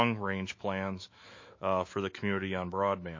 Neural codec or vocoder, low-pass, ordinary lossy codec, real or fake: autoencoder, 48 kHz, 128 numbers a frame, DAC-VAE, trained on Japanese speech; 7.2 kHz; MP3, 32 kbps; fake